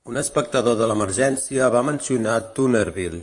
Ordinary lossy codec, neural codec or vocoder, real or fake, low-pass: AAC, 64 kbps; vocoder, 44.1 kHz, 128 mel bands, Pupu-Vocoder; fake; 10.8 kHz